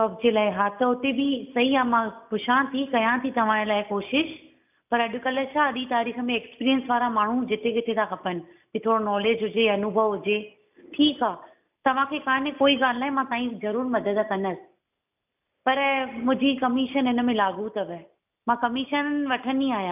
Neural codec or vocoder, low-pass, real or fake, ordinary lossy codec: none; 3.6 kHz; real; none